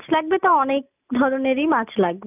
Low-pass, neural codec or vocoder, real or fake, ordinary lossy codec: 3.6 kHz; none; real; none